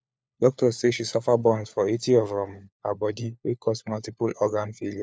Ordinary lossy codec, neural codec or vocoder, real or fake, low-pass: none; codec, 16 kHz, 4 kbps, FunCodec, trained on LibriTTS, 50 frames a second; fake; none